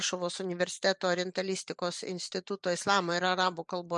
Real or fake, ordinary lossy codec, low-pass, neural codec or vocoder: fake; MP3, 96 kbps; 10.8 kHz; vocoder, 44.1 kHz, 128 mel bands every 512 samples, BigVGAN v2